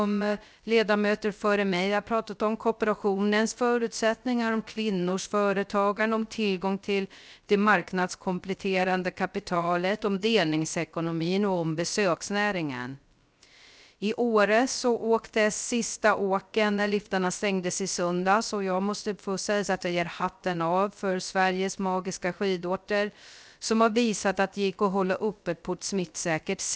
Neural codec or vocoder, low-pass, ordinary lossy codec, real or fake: codec, 16 kHz, 0.3 kbps, FocalCodec; none; none; fake